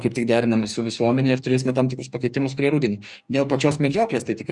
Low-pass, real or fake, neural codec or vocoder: 10.8 kHz; fake; codec, 44.1 kHz, 2.6 kbps, DAC